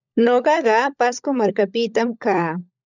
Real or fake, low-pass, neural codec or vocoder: fake; 7.2 kHz; codec, 16 kHz, 16 kbps, FunCodec, trained on LibriTTS, 50 frames a second